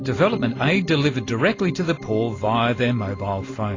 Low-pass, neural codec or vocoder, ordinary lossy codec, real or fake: 7.2 kHz; none; AAC, 32 kbps; real